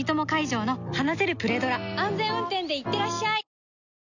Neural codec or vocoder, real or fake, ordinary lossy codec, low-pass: none; real; none; 7.2 kHz